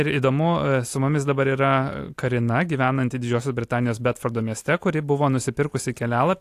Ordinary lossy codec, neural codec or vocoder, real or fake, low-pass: AAC, 64 kbps; none; real; 14.4 kHz